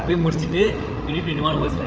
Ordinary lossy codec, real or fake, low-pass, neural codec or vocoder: none; fake; none; codec, 16 kHz, 16 kbps, FreqCodec, larger model